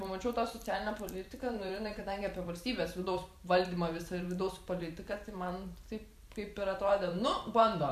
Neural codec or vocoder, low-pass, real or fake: vocoder, 44.1 kHz, 128 mel bands every 512 samples, BigVGAN v2; 14.4 kHz; fake